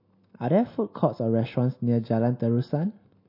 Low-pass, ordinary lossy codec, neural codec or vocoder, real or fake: 5.4 kHz; MP3, 32 kbps; none; real